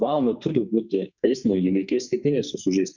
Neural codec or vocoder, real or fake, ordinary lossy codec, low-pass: codec, 44.1 kHz, 2.6 kbps, SNAC; fake; Opus, 64 kbps; 7.2 kHz